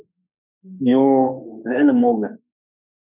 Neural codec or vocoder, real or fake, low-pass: autoencoder, 48 kHz, 32 numbers a frame, DAC-VAE, trained on Japanese speech; fake; 3.6 kHz